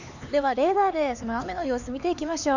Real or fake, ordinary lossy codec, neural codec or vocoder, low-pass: fake; none; codec, 16 kHz, 4 kbps, X-Codec, HuBERT features, trained on LibriSpeech; 7.2 kHz